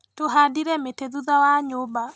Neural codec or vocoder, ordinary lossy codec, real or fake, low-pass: none; none; real; none